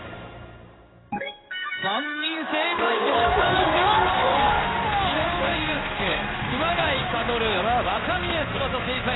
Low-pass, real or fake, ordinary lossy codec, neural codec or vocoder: 7.2 kHz; fake; AAC, 16 kbps; codec, 16 kHz in and 24 kHz out, 1 kbps, XY-Tokenizer